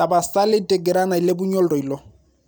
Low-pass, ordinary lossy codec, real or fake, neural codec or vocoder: none; none; real; none